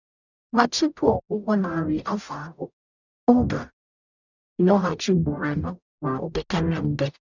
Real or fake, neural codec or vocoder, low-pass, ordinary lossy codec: fake; codec, 44.1 kHz, 0.9 kbps, DAC; 7.2 kHz; none